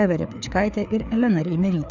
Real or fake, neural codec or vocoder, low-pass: fake; codec, 16 kHz, 4 kbps, FreqCodec, larger model; 7.2 kHz